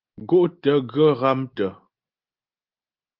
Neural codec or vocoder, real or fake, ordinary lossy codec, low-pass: none; real; Opus, 32 kbps; 5.4 kHz